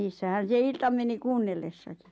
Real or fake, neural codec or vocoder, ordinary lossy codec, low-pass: real; none; none; none